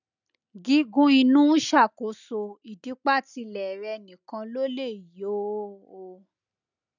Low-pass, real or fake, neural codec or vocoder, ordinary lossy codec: 7.2 kHz; real; none; none